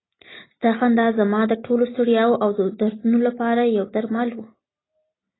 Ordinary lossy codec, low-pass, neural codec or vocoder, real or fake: AAC, 16 kbps; 7.2 kHz; none; real